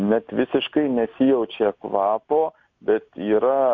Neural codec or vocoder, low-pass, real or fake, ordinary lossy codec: none; 7.2 kHz; real; MP3, 48 kbps